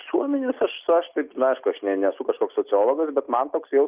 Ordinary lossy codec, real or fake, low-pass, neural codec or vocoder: Opus, 32 kbps; real; 3.6 kHz; none